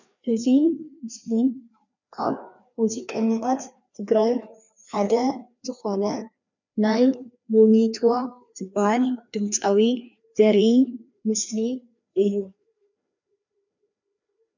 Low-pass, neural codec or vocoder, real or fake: 7.2 kHz; codec, 16 kHz, 2 kbps, FreqCodec, larger model; fake